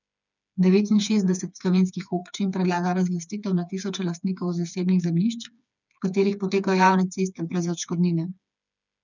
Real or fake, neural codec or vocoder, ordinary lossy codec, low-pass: fake; codec, 16 kHz, 4 kbps, FreqCodec, smaller model; none; 7.2 kHz